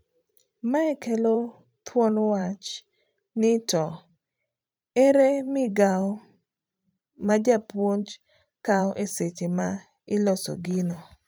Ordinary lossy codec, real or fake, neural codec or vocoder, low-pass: none; fake; vocoder, 44.1 kHz, 128 mel bands every 512 samples, BigVGAN v2; none